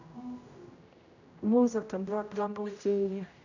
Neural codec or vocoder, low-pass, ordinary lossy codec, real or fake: codec, 16 kHz, 0.5 kbps, X-Codec, HuBERT features, trained on general audio; 7.2 kHz; none; fake